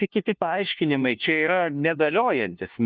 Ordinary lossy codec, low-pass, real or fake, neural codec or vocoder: Opus, 32 kbps; 7.2 kHz; fake; codec, 16 kHz, 1 kbps, FunCodec, trained on LibriTTS, 50 frames a second